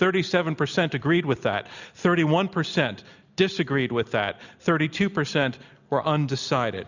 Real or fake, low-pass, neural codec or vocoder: real; 7.2 kHz; none